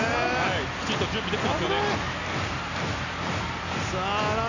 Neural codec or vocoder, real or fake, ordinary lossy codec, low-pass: none; real; none; 7.2 kHz